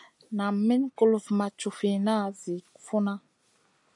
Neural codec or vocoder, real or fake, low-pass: none; real; 10.8 kHz